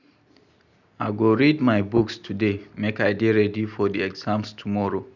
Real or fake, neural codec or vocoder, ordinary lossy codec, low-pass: real; none; none; 7.2 kHz